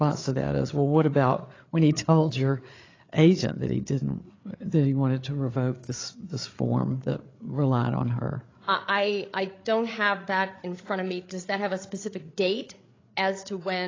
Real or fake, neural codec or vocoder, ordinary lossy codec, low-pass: fake; codec, 16 kHz, 8 kbps, FreqCodec, larger model; AAC, 32 kbps; 7.2 kHz